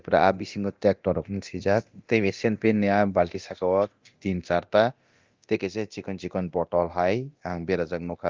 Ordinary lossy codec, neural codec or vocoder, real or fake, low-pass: Opus, 32 kbps; codec, 24 kHz, 0.9 kbps, DualCodec; fake; 7.2 kHz